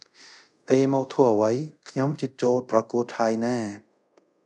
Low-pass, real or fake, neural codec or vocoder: 10.8 kHz; fake; codec, 24 kHz, 0.5 kbps, DualCodec